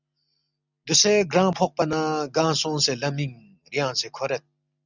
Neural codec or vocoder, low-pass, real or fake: none; 7.2 kHz; real